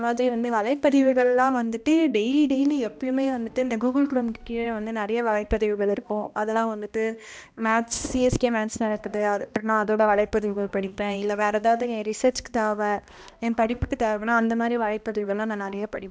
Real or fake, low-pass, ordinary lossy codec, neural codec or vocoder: fake; none; none; codec, 16 kHz, 1 kbps, X-Codec, HuBERT features, trained on balanced general audio